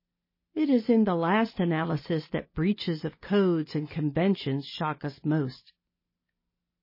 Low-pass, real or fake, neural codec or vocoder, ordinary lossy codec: 5.4 kHz; real; none; MP3, 24 kbps